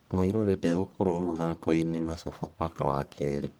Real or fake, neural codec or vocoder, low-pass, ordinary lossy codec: fake; codec, 44.1 kHz, 1.7 kbps, Pupu-Codec; none; none